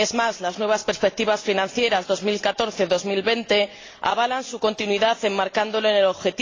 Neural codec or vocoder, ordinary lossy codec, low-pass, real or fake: none; AAC, 32 kbps; 7.2 kHz; real